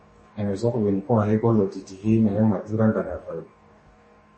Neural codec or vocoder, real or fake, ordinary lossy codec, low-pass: codec, 44.1 kHz, 2.6 kbps, DAC; fake; MP3, 32 kbps; 10.8 kHz